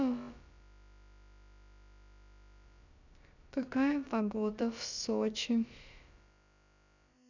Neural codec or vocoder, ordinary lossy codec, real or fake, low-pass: codec, 16 kHz, about 1 kbps, DyCAST, with the encoder's durations; none; fake; 7.2 kHz